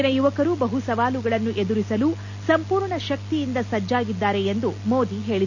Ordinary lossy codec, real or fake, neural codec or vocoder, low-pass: none; real; none; 7.2 kHz